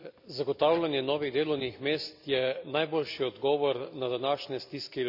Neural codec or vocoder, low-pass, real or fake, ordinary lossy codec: none; 5.4 kHz; real; none